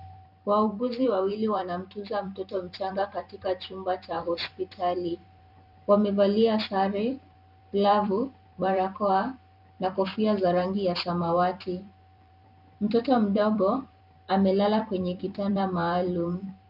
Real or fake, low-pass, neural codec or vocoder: fake; 5.4 kHz; vocoder, 44.1 kHz, 128 mel bands every 256 samples, BigVGAN v2